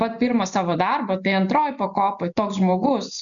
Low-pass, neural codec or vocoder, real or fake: 7.2 kHz; none; real